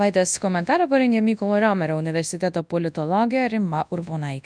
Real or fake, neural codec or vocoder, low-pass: fake; codec, 24 kHz, 0.9 kbps, WavTokenizer, large speech release; 9.9 kHz